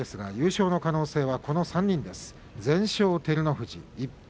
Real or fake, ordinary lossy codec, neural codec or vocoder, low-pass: real; none; none; none